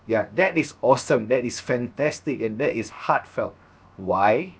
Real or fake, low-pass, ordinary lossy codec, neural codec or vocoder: fake; none; none; codec, 16 kHz, 0.7 kbps, FocalCodec